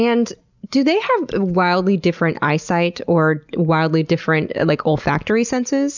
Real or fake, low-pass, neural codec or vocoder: real; 7.2 kHz; none